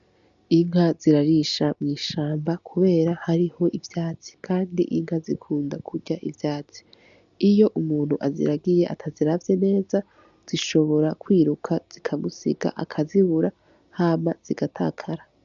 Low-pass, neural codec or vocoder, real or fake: 7.2 kHz; none; real